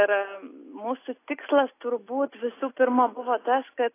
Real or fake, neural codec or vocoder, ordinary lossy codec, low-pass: real; none; AAC, 24 kbps; 3.6 kHz